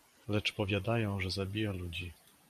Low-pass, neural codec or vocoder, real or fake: 14.4 kHz; vocoder, 44.1 kHz, 128 mel bands every 512 samples, BigVGAN v2; fake